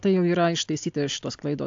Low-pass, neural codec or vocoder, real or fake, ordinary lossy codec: 7.2 kHz; none; real; AAC, 64 kbps